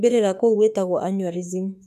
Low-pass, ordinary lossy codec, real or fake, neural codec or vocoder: 14.4 kHz; none; fake; autoencoder, 48 kHz, 32 numbers a frame, DAC-VAE, trained on Japanese speech